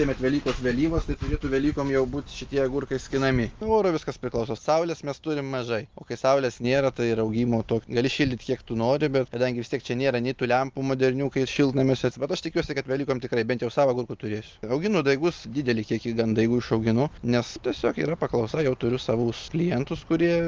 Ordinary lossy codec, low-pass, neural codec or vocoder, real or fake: Opus, 24 kbps; 7.2 kHz; none; real